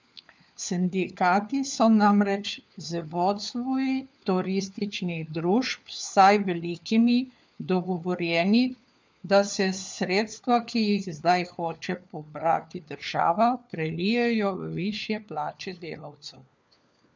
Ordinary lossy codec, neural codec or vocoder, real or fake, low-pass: none; codec, 16 kHz, 4 kbps, FunCodec, trained on LibriTTS, 50 frames a second; fake; none